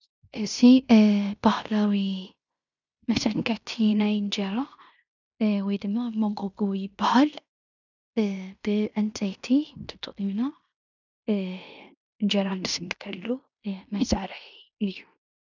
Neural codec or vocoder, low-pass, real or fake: codec, 16 kHz in and 24 kHz out, 0.9 kbps, LongCat-Audio-Codec, fine tuned four codebook decoder; 7.2 kHz; fake